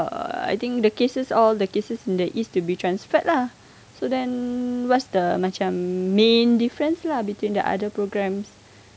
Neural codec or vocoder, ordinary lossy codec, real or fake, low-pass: none; none; real; none